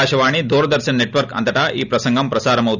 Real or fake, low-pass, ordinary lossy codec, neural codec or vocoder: real; 7.2 kHz; none; none